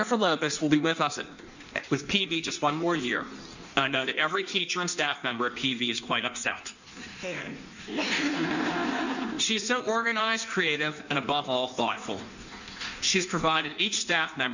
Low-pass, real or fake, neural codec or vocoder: 7.2 kHz; fake; codec, 16 kHz in and 24 kHz out, 1.1 kbps, FireRedTTS-2 codec